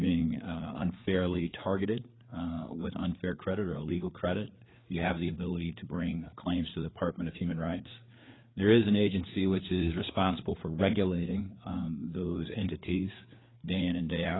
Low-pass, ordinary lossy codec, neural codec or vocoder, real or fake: 7.2 kHz; AAC, 16 kbps; codec, 16 kHz, 4 kbps, FreqCodec, larger model; fake